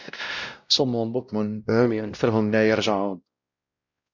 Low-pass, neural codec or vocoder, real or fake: 7.2 kHz; codec, 16 kHz, 0.5 kbps, X-Codec, WavLM features, trained on Multilingual LibriSpeech; fake